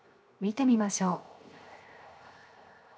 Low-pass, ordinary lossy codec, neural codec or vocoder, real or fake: none; none; codec, 16 kHz, 0.7 kbps, FocalCodec; fake